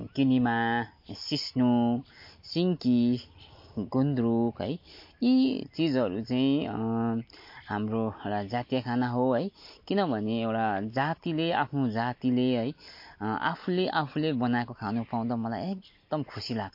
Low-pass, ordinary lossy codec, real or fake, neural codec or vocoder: 5.4 kHz; MP3, 32 kbps; real; none